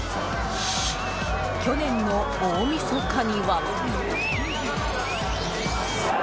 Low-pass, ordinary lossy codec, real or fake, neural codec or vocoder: none; none; real; none